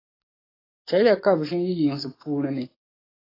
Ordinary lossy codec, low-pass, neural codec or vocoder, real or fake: AAC, 24 kbps; 5.4 kHz; codec, 44.1 kHz, 7.8 kbps, DAC; fake